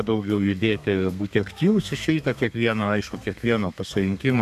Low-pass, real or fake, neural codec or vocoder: 14.4 kHz; fake; codec, 32 kHz, 1.9 kbps, SNAC